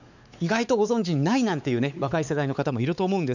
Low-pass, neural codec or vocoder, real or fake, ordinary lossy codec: 7.2 kHz; codec, 16 kHz, 4 kbps, X-Codec, WavLM features, trained on Multilingual LibriSpeech; fake; none